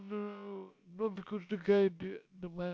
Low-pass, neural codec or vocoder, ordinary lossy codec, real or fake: none; codec, 16 kHz, about 1 kbps, DyCAST, with the encoder's durations; none; fake